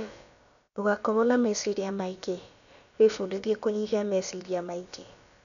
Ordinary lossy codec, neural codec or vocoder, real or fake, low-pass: MP3, 96 kbps; codec, 16 kHz, about 1 kbps, DyCAST, with the encoder's durations; fake; 7.2 kHz